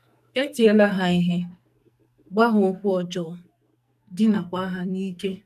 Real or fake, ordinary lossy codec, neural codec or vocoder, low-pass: fake; none; codec, 44.1 kHz, 2.6 kbps, SNAC; 14.4 kHz